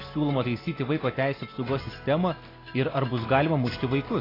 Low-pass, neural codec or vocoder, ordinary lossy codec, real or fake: 5.4 kHz; none; AAC, 24 kbps; real